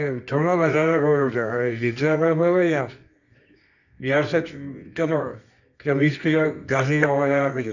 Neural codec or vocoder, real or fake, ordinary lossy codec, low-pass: codec, 24 kHz, 0.9 kbps, WavTokenizer, medium music audio release; fake; none; 7.2 kHz